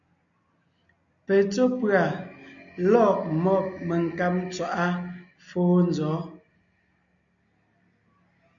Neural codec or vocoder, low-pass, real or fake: none; 7.2 kHz; real